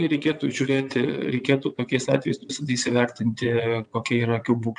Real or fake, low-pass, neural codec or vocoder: fake; 9.9 kHz; vocoder, 22.05 kHz, 80 mel bands, WaveNeXt